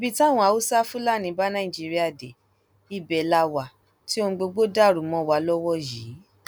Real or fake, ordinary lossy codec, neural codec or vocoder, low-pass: real; none; none; none